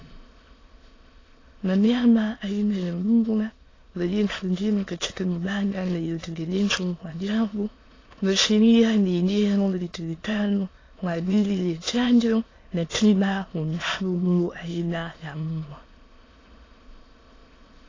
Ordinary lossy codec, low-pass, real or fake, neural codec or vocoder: AAC, 32 kbps; 7.2 kHz; fake; autoencoder, 22.05 kHz, a latent of 192 numbers a frame, VITS, trained on many speakers